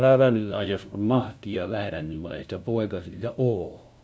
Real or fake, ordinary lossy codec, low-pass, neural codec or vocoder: fake; none; none; codec, 16 kHz, 0.5 kbps, FunCodec, trained on LibriTTS, 25 frames a second